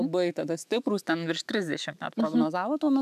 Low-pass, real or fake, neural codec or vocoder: 14.4 kHz; fake; autoencoder, 48 kHz, 128 numbers a frame, DAC-VAE, trained on Japanese speech